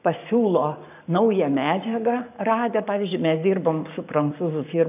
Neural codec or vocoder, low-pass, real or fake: codec, 44.1 kHz, 7.8 kbps, Pupu-Codec; 3.6 kHz; fake